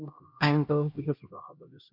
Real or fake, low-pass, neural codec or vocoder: fake; 5.4 kHz; codec, 16 kHz, 1 kbps, X-Codec, HuBERT features, trained on LibriSpeech